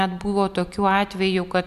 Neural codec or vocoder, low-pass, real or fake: none; 14.4 kHz; real